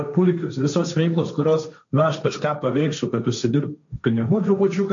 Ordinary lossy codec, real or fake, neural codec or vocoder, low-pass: MP3, 64 kbps; fake; codec, 16 kHz, 1.1 kbps, Voila-Tokenizer; 7.2 kHz